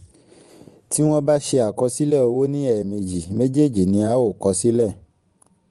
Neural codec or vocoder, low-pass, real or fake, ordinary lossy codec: vocoder, 24 kHz, 100 mel bands, Vocos; 10.8 kHz; fake; Opus, 32 kbps